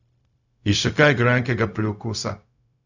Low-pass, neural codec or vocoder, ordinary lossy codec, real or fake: 7.2 kHz; codec, 16 kHz, 0.4 kbps, LongCat-Audio-Codec; none; fake